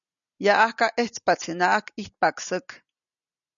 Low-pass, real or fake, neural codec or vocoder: 7.2 kHz; real; none